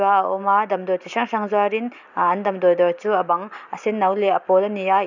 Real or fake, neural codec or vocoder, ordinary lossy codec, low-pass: real; none; none; 7.2 kHz